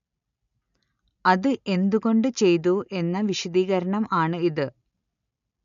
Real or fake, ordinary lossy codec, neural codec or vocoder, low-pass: real; none; none; 7.2 kHz